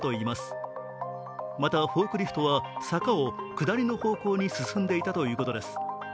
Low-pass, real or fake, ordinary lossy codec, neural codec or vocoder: none; real; none; none